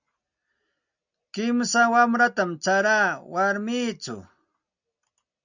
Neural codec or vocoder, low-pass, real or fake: none; 7.2 kHz; real